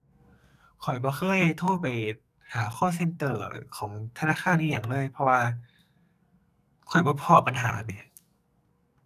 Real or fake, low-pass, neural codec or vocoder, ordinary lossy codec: fake; 14.4 kHz; codec, 44.1 kHz, 2.6 kbps, SNAC; none